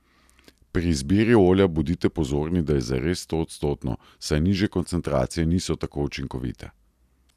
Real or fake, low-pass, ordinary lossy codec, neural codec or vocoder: real; 14.4 kHz; none; none